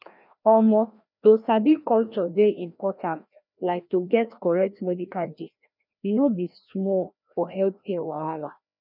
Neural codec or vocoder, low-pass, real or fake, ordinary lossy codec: codec, 16 kHz, 1 kbps, FreqCodec, larger model; 5.4 kHz; fake; none